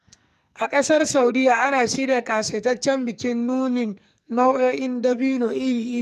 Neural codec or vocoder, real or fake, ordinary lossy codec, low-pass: codec, 44.1 kHz, 2.6 kbps, SNAC; fake; none; 14.4 kHz